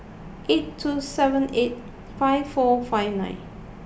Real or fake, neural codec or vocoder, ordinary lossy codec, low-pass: real; none; none; none